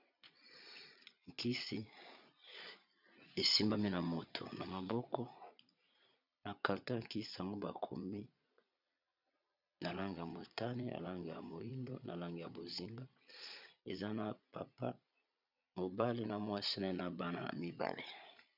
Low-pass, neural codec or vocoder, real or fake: 5.4 kHz; vocoder, 22.05 kHz, 80 mel bands, Vocos; fake